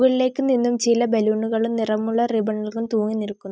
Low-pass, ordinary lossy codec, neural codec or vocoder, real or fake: none; none; none; real